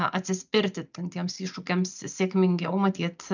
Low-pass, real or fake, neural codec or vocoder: 7.2 kHz; real; none